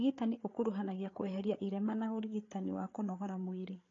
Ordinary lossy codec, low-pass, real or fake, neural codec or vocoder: AAC, 32 kbps; 7.2 kHz; fake; codec, 16 kHz, 8 kbps, FreqCodec, larger model